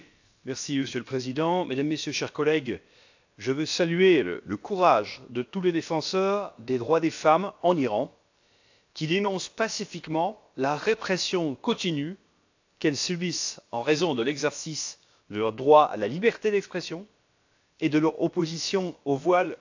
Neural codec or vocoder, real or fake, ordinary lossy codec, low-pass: codec, 16 kHz, about 1 kbps, DyCAST, with the encoder's durations; fake; AAC, 48 kbps; 7.2 kHz